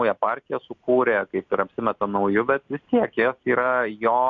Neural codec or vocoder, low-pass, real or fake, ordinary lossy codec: none; 7.2 kHz; real; MP3, 64 kbps